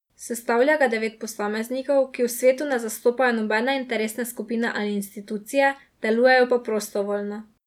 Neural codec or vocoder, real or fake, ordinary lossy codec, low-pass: none; real; none; 19.8 kHz